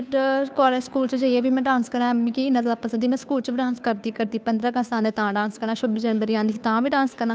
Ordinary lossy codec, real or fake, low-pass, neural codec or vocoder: none; fake; none; codec, 16 kHz, 2 kbps, FunCodec, trained on Chinese and English, 25 frames a second